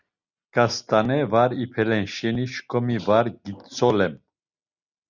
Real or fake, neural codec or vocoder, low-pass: real; none; 7.2 kHz